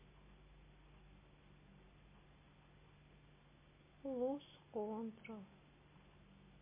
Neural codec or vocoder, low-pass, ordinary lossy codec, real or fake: none; 3.6 kHz; MP3, 16 kbps; real